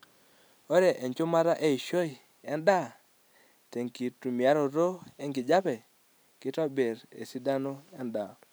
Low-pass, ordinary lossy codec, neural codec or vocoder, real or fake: none; none; none; real